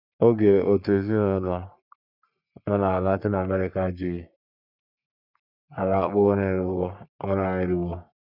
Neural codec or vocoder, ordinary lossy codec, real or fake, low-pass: codec, 44.1 kHz, 3.4 kbps, Pupu-Codec; none; fake; 5.4 kHz